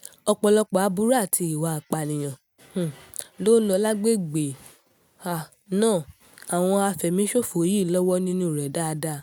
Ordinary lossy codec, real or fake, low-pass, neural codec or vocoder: none; real; none; none